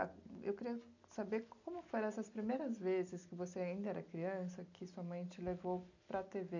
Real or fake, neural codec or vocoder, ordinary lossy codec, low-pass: real; none; none; 7.2 kHz